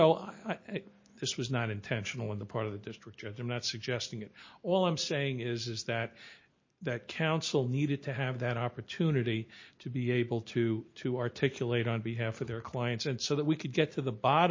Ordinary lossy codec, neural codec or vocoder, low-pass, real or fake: MP3, 32 kbps; none; 7.2 kHz; real